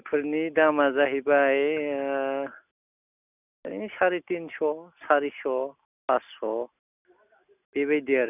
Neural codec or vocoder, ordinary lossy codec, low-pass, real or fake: none; none; 3.6 kHz; real